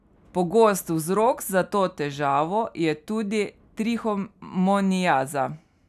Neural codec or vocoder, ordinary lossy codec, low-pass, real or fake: none; none; 14.4 kHz; real